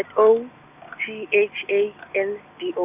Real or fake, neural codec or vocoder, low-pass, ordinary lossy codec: real; none; 3.6 kHz; none